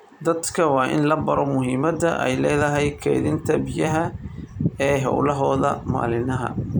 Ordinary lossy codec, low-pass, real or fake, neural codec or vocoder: none; 19.8 kHz; fake; vocoder, 44.1 kHz, 128 mel bands every 256 samples, BigVGAN v2